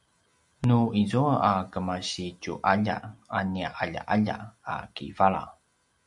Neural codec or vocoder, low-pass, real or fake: none; 10.8 kHz; real